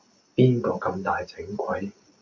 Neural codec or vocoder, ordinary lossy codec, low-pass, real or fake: none; MP3, 48 kbps; 7.2 kHz; real